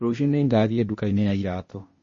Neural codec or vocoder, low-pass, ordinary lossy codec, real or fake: codec, 16 kHz, 0.8 kbps, ZipCodec; 7.2 kHz; MP3, 32 kbps; fake